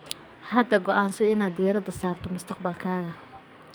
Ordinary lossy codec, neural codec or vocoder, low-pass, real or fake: none; codec, 44.1 kHz, 2.6 kbps, SNAC; none; fake